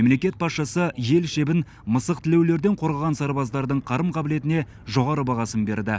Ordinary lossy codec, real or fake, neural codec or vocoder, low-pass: none; real; none; none